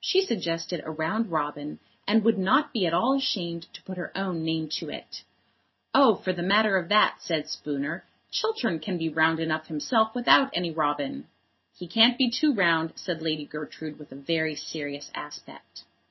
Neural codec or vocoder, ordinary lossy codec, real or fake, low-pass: none; MP3, 24 kbps; real; 7.2 kHz